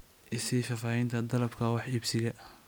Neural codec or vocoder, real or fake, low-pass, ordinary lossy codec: none; real; none; none